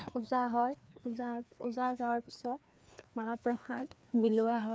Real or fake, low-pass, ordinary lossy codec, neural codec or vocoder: fake; none; none; codec, 16 kHz, 2 kbps, FreqCodec, larger model